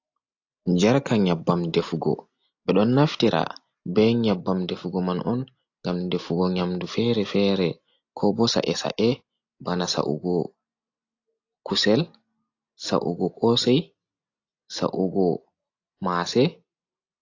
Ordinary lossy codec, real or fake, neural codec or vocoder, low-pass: AAC, 48 kbps; real; none; 7.2 kHz